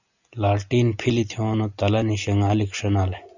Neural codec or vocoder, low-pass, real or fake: none; 7.2 kHz; real